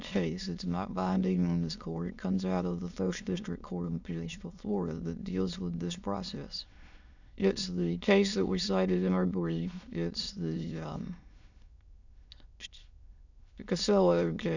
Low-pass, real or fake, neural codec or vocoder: 7.2 kHz; fake; autoencoder, 22.05 kHz, a latent of 192 numbers a frame, VITS, trained on many speakers